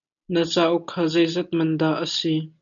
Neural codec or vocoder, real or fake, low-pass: none; real; 7.2 kHz